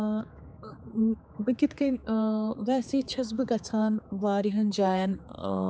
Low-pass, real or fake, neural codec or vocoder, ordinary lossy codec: none; fake; codec, 16 kHz, 4 kbps, X-Codec, HuBERT features, trained on general audio; none